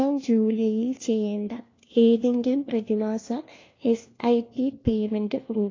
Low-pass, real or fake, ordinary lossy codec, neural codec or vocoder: 7.2 kHz; fake; AAC, 32 kbps; codec, 16 kHz, 1 kbps, FunCodec, trained on LibriTTS, 50 frames a second